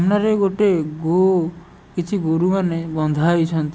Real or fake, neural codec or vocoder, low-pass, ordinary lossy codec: real; none; none; none